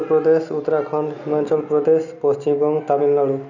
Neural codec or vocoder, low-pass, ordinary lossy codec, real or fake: none; 7.2 kHz; none; real